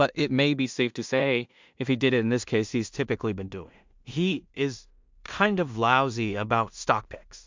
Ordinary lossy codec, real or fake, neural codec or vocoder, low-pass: MP3, 64 kbps; fake; codec, 16 kHz in and 24 kHz out, 0.4 kbps, LongCat-Audio-Codec, two codebook decoder; 7.2 kHz